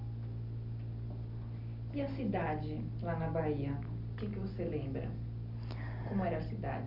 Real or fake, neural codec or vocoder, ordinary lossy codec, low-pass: real; none; none; 5.4 kHz